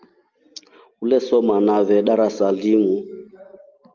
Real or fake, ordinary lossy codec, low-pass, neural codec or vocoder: real; Opus, 24 kbps; 7.2 kHz; none